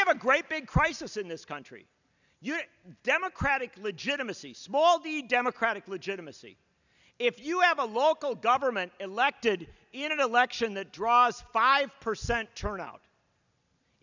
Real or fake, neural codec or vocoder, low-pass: real; none; 7.2 kHz